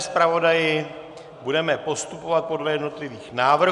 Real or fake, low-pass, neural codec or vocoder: real; 10.8 kHz; none